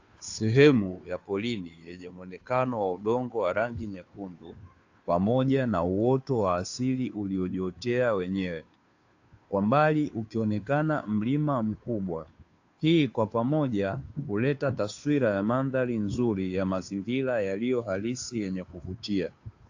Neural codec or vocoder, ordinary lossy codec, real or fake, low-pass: codec, 16 kHz, 2 kbps, FunCodec, trained on Chinese and English, 25 frames a second; AAC, 48 kbps; fake; 7.2 kHz